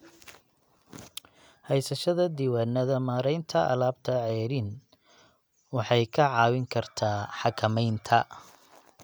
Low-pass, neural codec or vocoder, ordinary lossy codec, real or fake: none; none; none; real